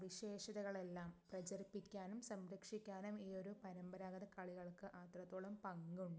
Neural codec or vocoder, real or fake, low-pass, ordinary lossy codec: none; real; none; none